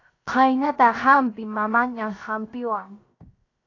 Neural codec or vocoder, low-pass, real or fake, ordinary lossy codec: codec, 16 kHz, 0.7 kbps, FocalCodec; 7.2 kHz; fake; AAC, 32 kbps